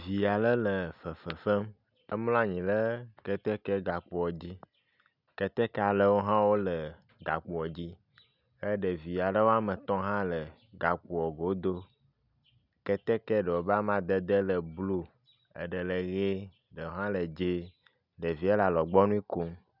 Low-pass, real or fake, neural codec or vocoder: 5.4 kHz; real; none